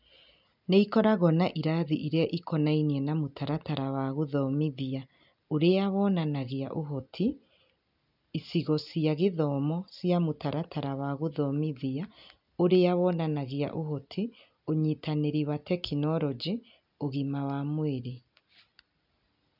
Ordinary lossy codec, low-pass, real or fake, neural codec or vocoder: none; 5.4 kHz; real; none